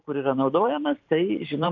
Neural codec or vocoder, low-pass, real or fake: vocoder, 44.1 kHz, 128 mel bands every 512 samples, BigVGAN v2; 7.2 kHz; fake